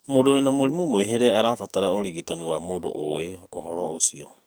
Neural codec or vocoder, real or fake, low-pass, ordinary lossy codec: codec, 44.1 kHz, 2.6 kbps, SNAC; fake; none; none